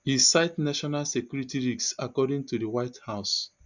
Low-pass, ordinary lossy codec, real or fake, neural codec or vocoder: 7.2 kHz; none; real; none